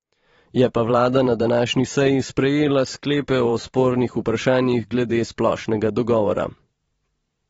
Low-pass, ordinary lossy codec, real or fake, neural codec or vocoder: 19.8 kHz; AAC, 24 kbps; fake; vocoder, 44.1 kHz, 128 mel bands every 512 samples, BigVGAN v2